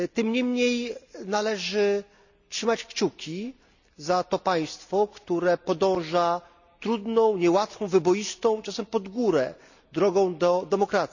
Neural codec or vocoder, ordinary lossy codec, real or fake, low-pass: none; none; real; 7.2 kHz